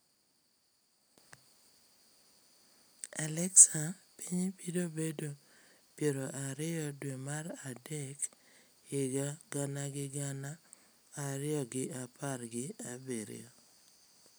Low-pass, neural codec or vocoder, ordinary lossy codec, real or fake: none; none; none; real